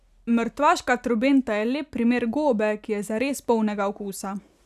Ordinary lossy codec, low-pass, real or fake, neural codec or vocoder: none; 14.4 kHz; real; none